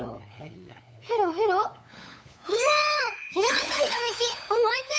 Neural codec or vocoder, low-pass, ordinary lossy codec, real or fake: codec, 16 kHz, 8 kbps, FunCodec, trained on LibriTTS, 25 frames a second; none; none; fake